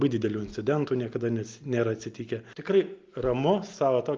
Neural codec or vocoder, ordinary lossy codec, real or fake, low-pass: none; Opus, 24 kbps; real; 7.2 kHz